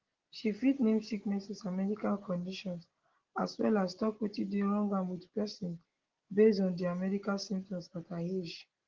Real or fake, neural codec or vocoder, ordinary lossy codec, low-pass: real; none; Opus, 16 kbps; 7.2 kHz